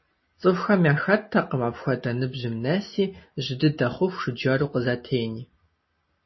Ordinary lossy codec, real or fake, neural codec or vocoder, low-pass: MP3, 24 kbps; real; none; 7.2 kHz